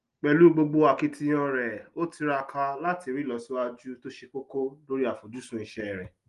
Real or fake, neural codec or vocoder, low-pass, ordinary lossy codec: real; none; 10.8 kHz; Opus, 24 kbps